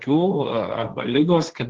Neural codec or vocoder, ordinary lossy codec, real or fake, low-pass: codec, 16 kHz, 1.1 kbps, Voila-Tokenizer; Opus, 16 kbps; fake; 7.2 kHz